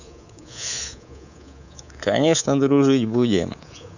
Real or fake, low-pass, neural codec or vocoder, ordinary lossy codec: fake; 7.2 kHz; codec, 24 kHz, 3.1 kbps, DualCodec; none